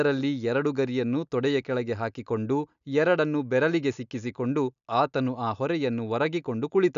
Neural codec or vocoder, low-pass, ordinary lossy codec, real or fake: none; 7.2 kHz; none; real